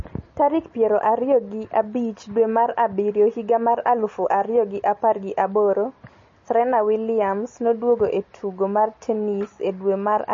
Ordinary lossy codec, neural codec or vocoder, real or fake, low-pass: MP3, 32 kbps; none; real; 7.2 kHz